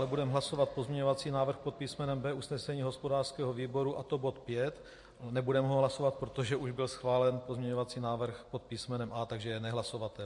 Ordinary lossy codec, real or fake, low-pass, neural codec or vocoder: MP3, 48 kbps; real; 10.8 kHz; none